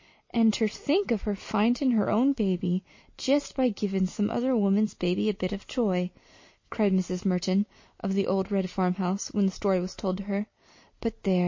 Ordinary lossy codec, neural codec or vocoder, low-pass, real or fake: MP3, 32 kbps; none; 7.2 kHz; real